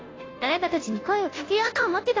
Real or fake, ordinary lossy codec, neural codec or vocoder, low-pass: fake; AAC, 32 kbps; codec, 16 kHz, 0.5 kbps, FunCodec, trained on Chinese and English, 25 frames a second; 7.2 kHz